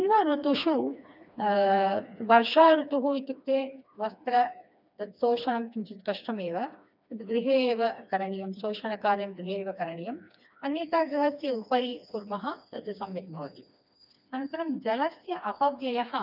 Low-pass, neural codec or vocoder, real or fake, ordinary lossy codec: 5.4 kHz; codec, 16 kHz, 2 kbps, FreqCodec, smaller model; fake; none